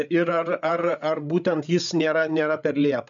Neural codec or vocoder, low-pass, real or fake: codec, 16 kHz, 8 kbps, FreqCodec, larger model; 7.2 kHz; fake